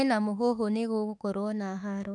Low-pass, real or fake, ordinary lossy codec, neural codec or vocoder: 10.8 kHz; fake; none; autoencoder, 48 kHz, 32 numbers a frame, DAC-VAE, trained on Japanese speech